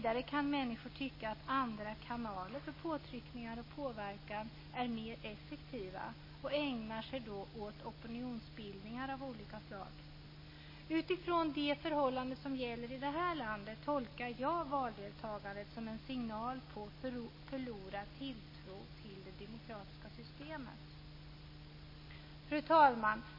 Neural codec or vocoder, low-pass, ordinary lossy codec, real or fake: none; 5.4 kHz; MP3, 24 kbps; real